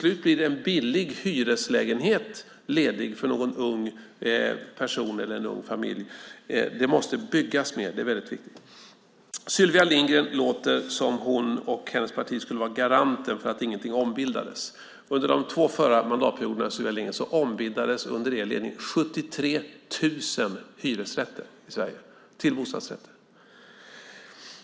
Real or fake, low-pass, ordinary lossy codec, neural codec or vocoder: real; none; none; none